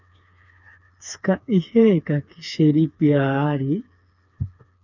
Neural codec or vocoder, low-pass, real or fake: codec, 16 kHz, 4 kbps, FreqCodec, smaller model; 7.2 kHz; fake